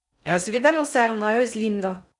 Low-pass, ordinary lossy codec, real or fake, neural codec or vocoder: 10.8 kHz; none; fake; codec, 16 kHz in and 24 kHz out, 0.6 kbps, FocalCodec, streaming, 4096 codes